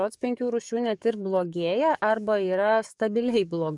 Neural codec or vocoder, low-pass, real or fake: codec, 44.1 kHz, 7.8 kbps, DAC; 10.8 kHz; fake